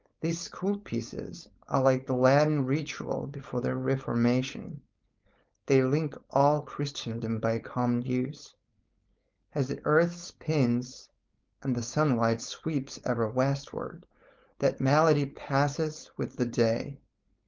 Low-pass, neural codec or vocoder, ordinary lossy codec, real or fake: 7.2 kHz; codec, 16 kHz, 4.8 kbps, FACodec; Opus, 24 kbps; fake